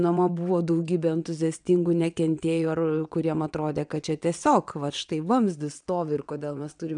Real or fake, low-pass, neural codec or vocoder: fake; 9.9 kHz; vocoder, 22.05 kHz, 80 mel bands, WaveNeXt